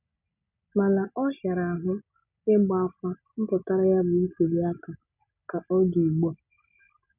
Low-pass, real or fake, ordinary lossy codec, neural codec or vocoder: 3.6 kHz; real; none; none